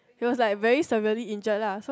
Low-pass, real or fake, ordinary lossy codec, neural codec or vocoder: none; real; none; none